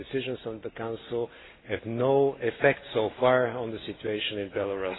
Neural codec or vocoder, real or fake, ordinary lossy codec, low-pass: none; real; AAC, 16 kbps; 7.2 kHz